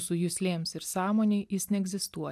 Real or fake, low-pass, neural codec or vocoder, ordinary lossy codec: real; 14.4 kHz; none; MP3, 96 kbps